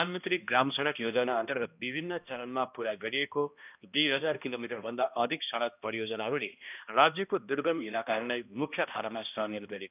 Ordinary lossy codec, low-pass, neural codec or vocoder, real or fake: none; 3.6 kHz; codec, 16 kHz, 1 kbps, X-Codec, HuBERT features, trained on balanced general audio; fake